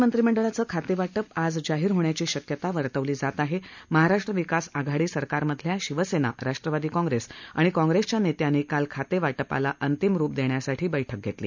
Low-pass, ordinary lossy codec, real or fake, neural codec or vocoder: 7.2 kHz; none; real; none